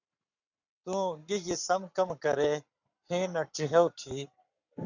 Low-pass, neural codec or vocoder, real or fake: 7.2 kHz; codec, 44.1 kHz, 7.8 kbps, Pupu-Codec; fake